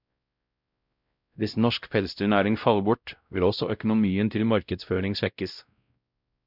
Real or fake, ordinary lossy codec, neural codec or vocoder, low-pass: fake; none; codec, 16 kHz, 0.5 kbps, X-Codec, WavLM features, trained on Multilingual LibriSpeech; 5.4 kHz